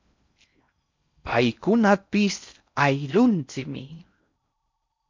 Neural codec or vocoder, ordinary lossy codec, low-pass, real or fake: codec, 16 kHz in and 24 kHz out, 0.6 kbps, FocalCodec, streaming, 4096 codes; MP3, 64 kbps; 7.2 kHz; fake